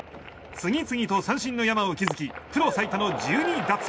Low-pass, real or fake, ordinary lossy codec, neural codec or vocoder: none; real; none; none